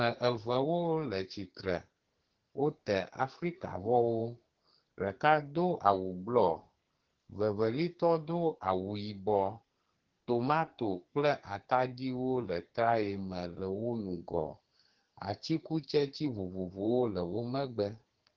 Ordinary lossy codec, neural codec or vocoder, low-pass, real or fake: Opus, 16 kbps; codec, 44.1 kHz, 2.6 kbps, SNAC; 7.2 kHz; fake